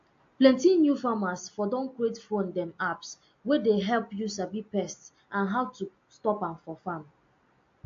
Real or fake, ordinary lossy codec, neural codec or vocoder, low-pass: real; AAC, 48 kbps; none; 7.2 kHz